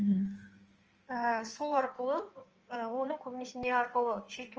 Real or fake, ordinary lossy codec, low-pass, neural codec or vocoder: fake; Opus, 24 kbps; 7.2 kHz; codec, 16 kHz in and 24 kHz out, 1.1 kbps, FireRedTTS-2 codec